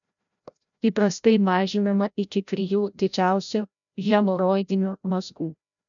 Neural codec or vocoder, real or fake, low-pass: codec, 16 kHz, 0.5 kbps, FreqCodec, larger model; fake; 7.2 kHz